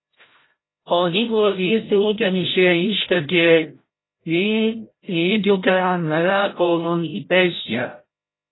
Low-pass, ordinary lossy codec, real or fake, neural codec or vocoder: 7.2 kHz; AAC, 16 kbps; fake; codec, 16 kHz, 0.5 kbps, FreqCodec, larger model